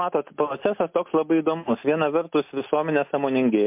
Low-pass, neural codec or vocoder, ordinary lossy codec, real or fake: 3.6 kHz; none; MP3, 32 kbps; real